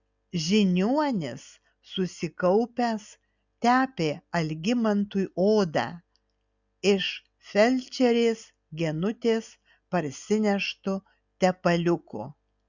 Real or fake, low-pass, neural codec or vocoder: real; 7.2 kHz; none